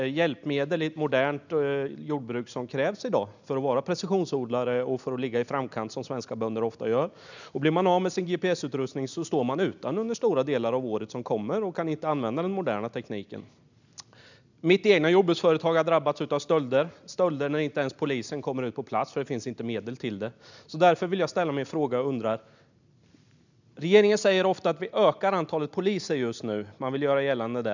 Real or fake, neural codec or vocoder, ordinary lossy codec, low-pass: real; none; none; 7.2 kHz